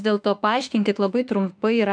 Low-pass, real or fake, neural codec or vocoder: 9.9 kHz; fake; autoencoder, 48 kHz, 32 numbers a frame, DAC-VAE, trained on Japanese speech